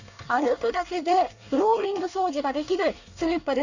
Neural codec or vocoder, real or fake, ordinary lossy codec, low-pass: codec, 24 kHz, 1 kbps, SNAC; fake; none; 7.2 kHz